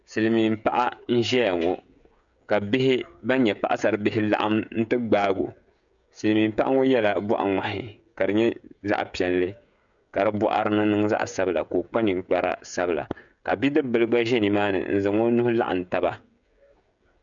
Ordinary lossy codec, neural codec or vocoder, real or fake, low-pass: MP3, 96 kbps; codec, 16 kHz, 16 kbps, FreqCodec, smaller model; fake; 7.2 kHz